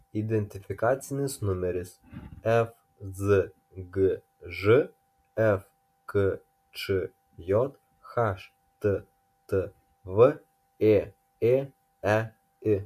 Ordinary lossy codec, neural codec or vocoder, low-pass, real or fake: MP3, 64 kbps; none; 14.4 kHz; real